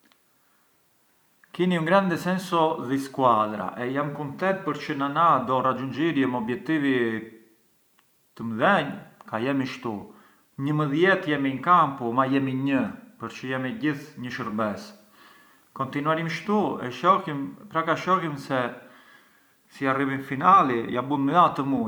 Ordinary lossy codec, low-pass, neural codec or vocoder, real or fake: none; none; none; real